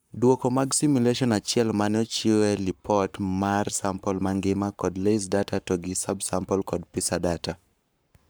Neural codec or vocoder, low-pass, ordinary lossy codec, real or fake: codec, 44.1 kHz, 7.8 kbps, Pupu-Codec; none; none; fake